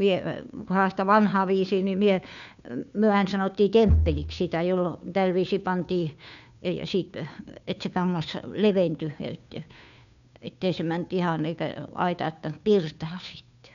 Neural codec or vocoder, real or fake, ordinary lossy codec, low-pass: codec, 16 kHz, 2 kbps, FunCodec, trained on Chinese and English, 25 frames a second; fake; none; 7.2 kHz